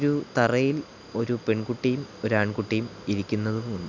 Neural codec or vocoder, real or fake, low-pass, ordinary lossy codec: none; real; 7.2 kHz; none